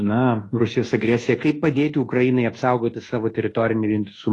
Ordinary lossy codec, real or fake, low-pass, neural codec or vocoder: AAC, 32 kbps; fake; 10.8 kHz; codec, 24 kHz, 1.2 kbps, DualCodec